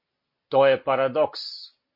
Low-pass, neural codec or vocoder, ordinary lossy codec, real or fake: 5.4 kHz; none; MP3, 24 kbps; real